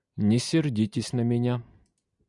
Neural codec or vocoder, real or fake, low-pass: none; real; 10.8 kHz